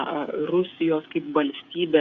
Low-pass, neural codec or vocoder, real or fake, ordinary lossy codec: 7.2 kHz; codec, 16 kHz, 8 kbps, FreqCodec, smaller model; fake; Opus, 64 kbps